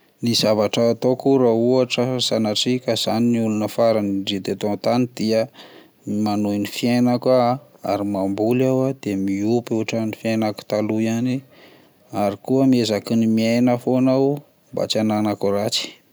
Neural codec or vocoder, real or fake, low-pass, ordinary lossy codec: none; real; none; none